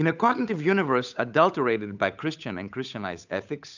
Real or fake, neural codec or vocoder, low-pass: fake; codec, 16 kHz, 8 kbps, FunCodec, trained on Chinese and English, 25 frames a second; 7.2 kHz